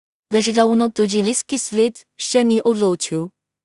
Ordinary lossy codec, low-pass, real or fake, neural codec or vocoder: Opus, 64 kbps; 10.8 kHz; fake; codec, 16 kHz in and 24 kHz out, 0.4 kbps, LongCat-Audio-Codec, two codebook decoder